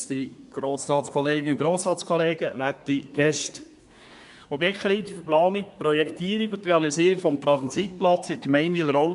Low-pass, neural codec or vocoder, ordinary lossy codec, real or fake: 10.8 kHz; codec, 24 kHz, 1 kbps, SNAC; none; fake